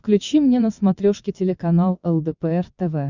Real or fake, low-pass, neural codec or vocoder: fake; 7.2 kHz; vocoder, 22.05 kHz, 80 mel bands, WaveNeXt